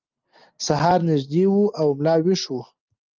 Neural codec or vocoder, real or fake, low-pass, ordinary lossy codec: none; real; 7.2 kHz; Opus, 32 kbps